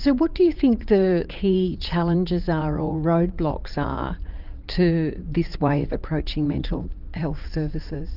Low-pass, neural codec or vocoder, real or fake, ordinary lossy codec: 5.4 kHz; vocoder, 44.1 kHz, 80 mel bands, Vocos; fake; Opus, 24 kbps